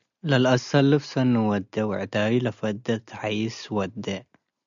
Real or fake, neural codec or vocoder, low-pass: real; none; 7.2 kHz